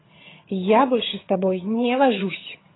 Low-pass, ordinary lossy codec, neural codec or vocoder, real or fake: 7.2 kHz; AAC, 16 kbps; vocoder, 22.05 kHz, 80 mel bands, HiFi-GAN; fake